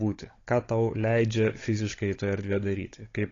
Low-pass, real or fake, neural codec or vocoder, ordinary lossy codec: 7.2 kHz; fake; codec, 16 kHz, 16 kbps, FunCodec, trained on Chinese and English, 50 frames a second; AAC, 32 kbps